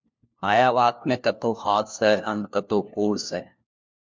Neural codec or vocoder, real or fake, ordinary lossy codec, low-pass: codec, 16 kHz, 1 kbps, FunCodec, trained on LibriTTS, 50 frames a second; fake; MP3, 64 kbps; 7.2 kHz